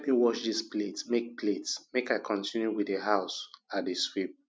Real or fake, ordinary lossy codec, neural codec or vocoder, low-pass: real; none; none; none